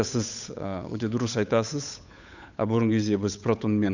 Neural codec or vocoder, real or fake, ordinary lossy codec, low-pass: codec, 16 kHz, 8 kbps, FunCodec, trained on Chinese and English, 25 frames a second; fake; none; 7.2 kHz